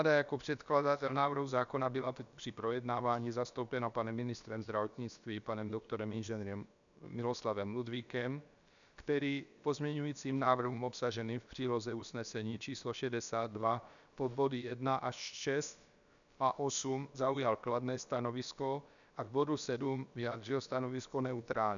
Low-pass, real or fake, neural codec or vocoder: 7.2 kHz; fake; codec, 16 kHz, about 1 kbps, DyCAST, with the encoder's durations